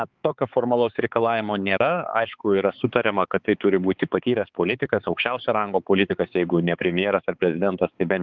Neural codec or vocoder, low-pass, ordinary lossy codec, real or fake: codec, 16 kHz, 4 kbps, X-Codec, HuBERT features, trained on balanced general audio; 7.2 kHz; Opus, 32 kbps; fake